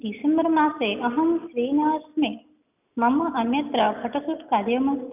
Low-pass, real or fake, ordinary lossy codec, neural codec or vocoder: 3.6 kHz; real; none; none